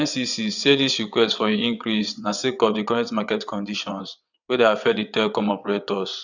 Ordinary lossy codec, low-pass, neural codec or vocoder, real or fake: none; 7.2 kHz; vocoder, 22.05 kHz, 80 mel bands, WaveNeXt; fake